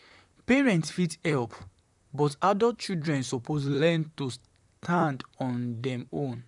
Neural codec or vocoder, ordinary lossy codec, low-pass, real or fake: vocoder, 44.1 kHz, 128 mel bands, Pupu-Vocoder; none; 10.8 kHz; fake